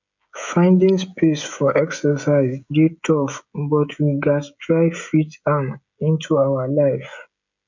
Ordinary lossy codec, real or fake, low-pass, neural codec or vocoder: none; fake; 7.2 kHz; codec, 16 kHz, 16 kbps, FreqCodec, smaller model